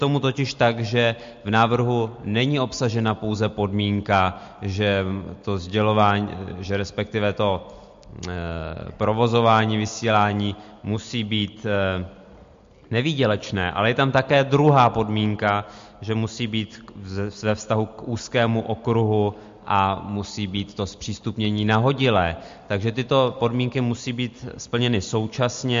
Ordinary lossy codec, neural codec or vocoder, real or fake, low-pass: MP3, 48 kbps; none; real; 7.2 kHz